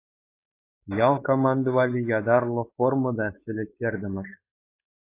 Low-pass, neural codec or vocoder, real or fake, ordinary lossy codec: 3.6 kHz; codec, 16 kHz, 4.8 kbps, FACodec; fake; AAC, 24 kbps